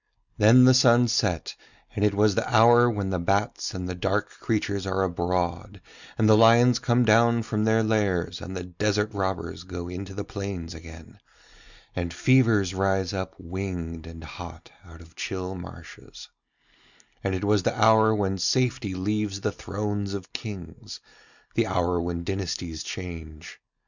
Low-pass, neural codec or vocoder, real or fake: 7.2 kHz; none; real